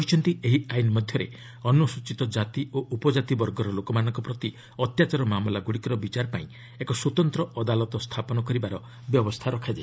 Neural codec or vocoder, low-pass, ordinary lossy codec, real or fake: none; none; none; real